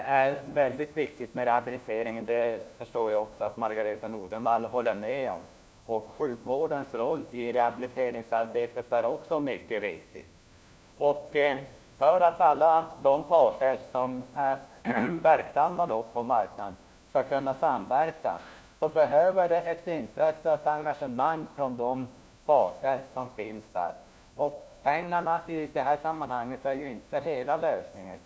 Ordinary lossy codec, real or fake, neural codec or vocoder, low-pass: none; fake; codec, 16 kHz, 1 kbps, FunCodec, trained on LibriTTS, 50 frames a second; none